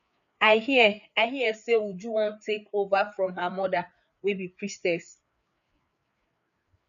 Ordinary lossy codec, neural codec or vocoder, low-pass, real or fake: none; codec, 16 kHz, 4 kbps, FreqCodec, larger model; 7.2 kHz; fake